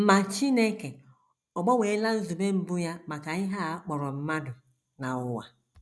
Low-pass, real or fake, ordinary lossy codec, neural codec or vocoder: none; real; none; none